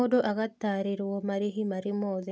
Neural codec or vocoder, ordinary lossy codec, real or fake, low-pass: none; none; real; none